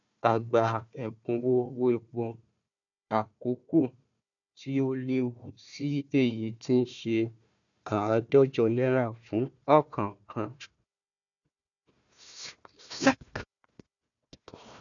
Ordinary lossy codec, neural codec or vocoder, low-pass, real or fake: none; codec, 16 kHz, 1 kbps, FunCodec, trained on Chinese and English, 50 frames a second; 7.2 kHz; fake